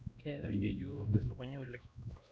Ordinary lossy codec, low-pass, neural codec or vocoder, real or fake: none; none; codec, 16 kHz, 1 kbps, X-Codec, WavLM features, trained on Multilingual LibriSpeech; fake